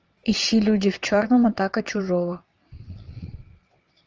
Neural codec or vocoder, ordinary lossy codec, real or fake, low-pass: vocoder, 22.05 kHz, 80 mel bands, WaveNeXt; Opus, 24 kbps; fake; 7.2 kHz